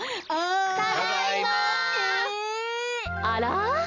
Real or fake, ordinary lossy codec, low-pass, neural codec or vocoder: real; none; 7.2 kHz; none